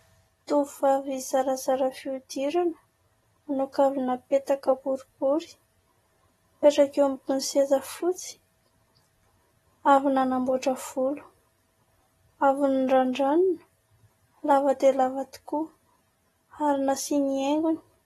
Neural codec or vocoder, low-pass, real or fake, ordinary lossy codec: none; 19.8 kHz; real; AAC, 32 kbps